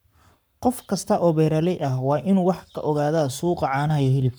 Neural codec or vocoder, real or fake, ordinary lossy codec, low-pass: codec, 44.1 kHz, 7.8 kbps, Pupu-Codec; fake; none; none